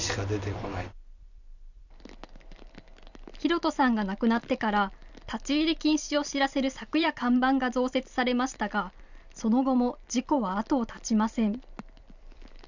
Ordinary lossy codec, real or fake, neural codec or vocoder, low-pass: none; fake; vocoder, 44.1 kHz, 128 mel bands every 256 samples, BigVGAN v2; 7.2 kHz